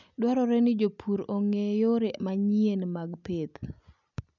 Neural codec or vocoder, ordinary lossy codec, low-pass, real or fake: none; none; 7.2 kHz; real